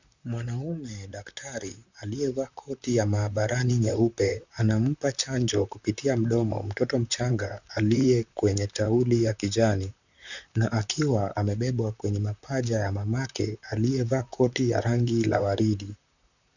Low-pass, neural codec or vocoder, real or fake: 7.2 kHz; vocoder, 44.1 kHz, 128 mel bands, Pupu-Vocoder; fake